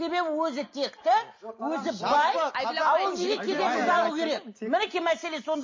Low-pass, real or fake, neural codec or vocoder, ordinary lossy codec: 7.2 kHz; real; none; MP3, 32 kbps